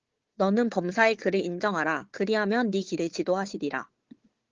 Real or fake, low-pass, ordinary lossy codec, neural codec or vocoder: fake; 7.2 kHz; Opus, 16 kbps; codec, 16 kHz, 4 kbps, FunCodec, trained on Chinese and English, 50 frames a second